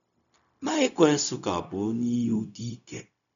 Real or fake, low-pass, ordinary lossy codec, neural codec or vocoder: fake; 7.2 kHz; none; codec, 16 kHz, 0.4 kbps, LongCat-Audio-Codec